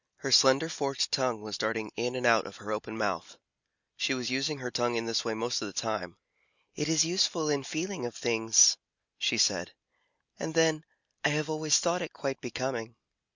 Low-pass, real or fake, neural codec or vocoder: 7.2 kHz; real; none